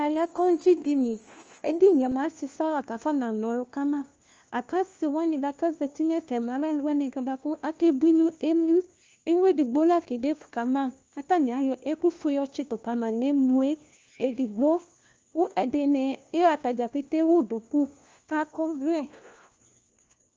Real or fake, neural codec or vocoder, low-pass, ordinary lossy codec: fake; codec, 16 kHz, 1 kbps, FunCodec, trained on LibriTTS, 50 frames a second; 7.2 kHz; Opus, 24 kbps